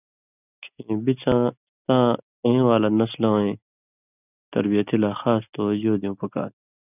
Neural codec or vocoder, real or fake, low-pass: none; real; 3.6 kHz